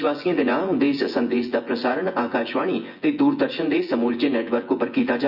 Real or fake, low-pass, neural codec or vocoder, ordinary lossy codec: fake; 5.4 kHz; vocoder, 24 kHz, 100 mel bands, Vocos; Opus, 64 kbps